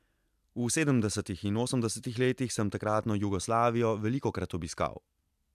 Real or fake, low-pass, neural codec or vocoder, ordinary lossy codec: real; 14.4 kHz; none; MP3, 96 kbps